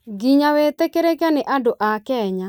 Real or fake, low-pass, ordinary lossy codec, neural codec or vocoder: real; none; none; none